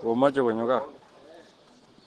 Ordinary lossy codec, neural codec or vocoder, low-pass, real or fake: Opus, 16 kbps; none; 10.8 kHz; real